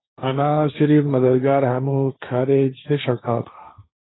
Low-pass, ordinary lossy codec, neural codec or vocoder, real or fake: 7.2 kHz; AAC, 16 kbps; codec, 16 kHz, 1.1 kbps, Voila-Tokenizer; fake